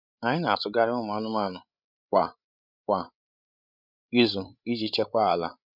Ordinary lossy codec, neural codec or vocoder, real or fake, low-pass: none; codec, 16 kHz, 16 kbps, FreqCodec, larger model; fake; 5.4 kHz